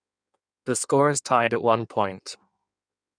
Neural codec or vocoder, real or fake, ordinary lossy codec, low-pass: codec, 16 kHz in and 24 kHz out, 1.1 kbps, FireRedTTS-2 codec; fake; none; 9.9 kHz